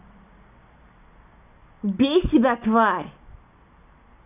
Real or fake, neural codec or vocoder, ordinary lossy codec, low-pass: real; none; none; 3.6 kHz